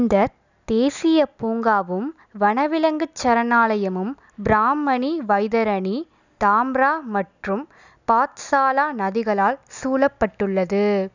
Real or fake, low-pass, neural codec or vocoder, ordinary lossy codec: real; 7.2 kHz; none; none